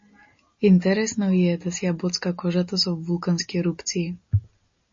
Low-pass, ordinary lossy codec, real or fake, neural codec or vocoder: 7.2 kHz; MP3, 32 kbps; real; none